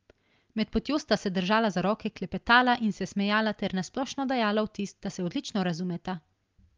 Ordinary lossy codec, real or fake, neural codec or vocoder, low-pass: Opus, 32 kbps; real; none; 7.2 kHz